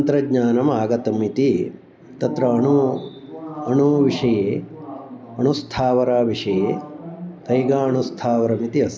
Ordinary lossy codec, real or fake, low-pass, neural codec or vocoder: none; real; none; none